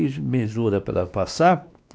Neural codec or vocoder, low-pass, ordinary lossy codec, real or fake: codec, 16 kHz, 2 kbps, X-Codec, WavLM features, trained on Multilingual LibriSpeech; none; none; fake